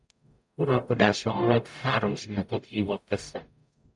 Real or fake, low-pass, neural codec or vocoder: fake; 10.8 kHz; codec, 44.1 kHz, 0.9 kbps, DAC